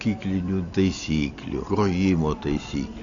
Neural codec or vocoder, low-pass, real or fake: none; 7.2 kHz; real